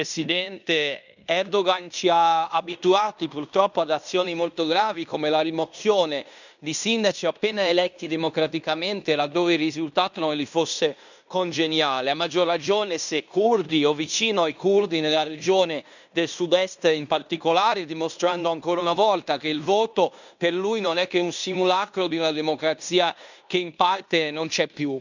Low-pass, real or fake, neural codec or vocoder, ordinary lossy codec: 7.2 kHz; fake; codec, 16 kHz in and 24 kHz out, 0.9 kbps, LongCat-Audio-Codec, fine tuned four codebook decoder; none